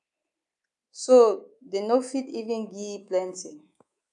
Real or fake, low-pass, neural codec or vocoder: fake; 10.8 kHz; codec, 24 kHz, 3.1 kbps, DualCodec